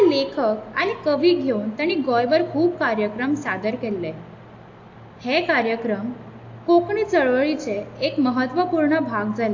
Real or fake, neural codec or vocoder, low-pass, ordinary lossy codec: real; none; 7.2 kHz; AAC, 48 kbps